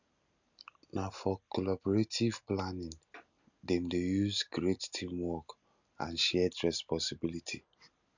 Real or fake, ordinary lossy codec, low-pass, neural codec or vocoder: real; none; 7.2 kHz; none